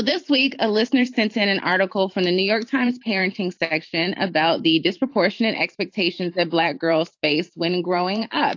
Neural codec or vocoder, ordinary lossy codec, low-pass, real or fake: vocoder, 44.1 kHz, 128 mel bands every 512 samples, BigVGAN v2; AAC, 48 kbps; 7.2 kHz; fake